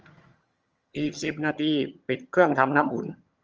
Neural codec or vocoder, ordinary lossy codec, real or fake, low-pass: vocoder, 22.05 kHz, 80 mel bands, HiFi-GAN; Opus, 24 kbps; fake; 7.2 kHz